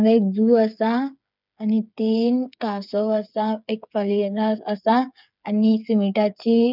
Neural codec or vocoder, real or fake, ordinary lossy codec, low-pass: codec, 16 kHz, 8 kbps, FreqCodec, smaller model; fake; none; 5.4 kHz